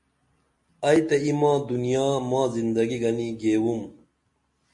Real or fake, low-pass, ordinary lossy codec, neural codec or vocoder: real; 10.8 kHz; MP3, 48 kbps; none